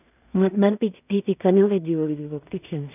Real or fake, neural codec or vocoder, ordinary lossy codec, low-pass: fake; codec, 16 kHz in and 24 kHz out, 0.4 kbps, LongCat-Audio-Codec, two codebook decoder; none; 3.6 kHz